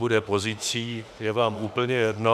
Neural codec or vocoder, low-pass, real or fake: autoencoder, 48 kHz, 32 numbers a frame, DAC-VAE, trained on Japanese speech; 14.4 kHz; fake